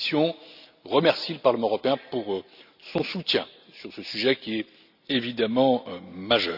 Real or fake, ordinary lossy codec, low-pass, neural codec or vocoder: real; none; 5.4 kHz; none